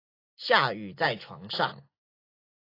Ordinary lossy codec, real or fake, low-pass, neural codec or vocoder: AAC, 24 kbps; real; 5.4 kHz; none